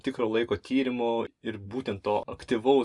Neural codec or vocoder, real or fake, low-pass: none; real; 10.8 kHz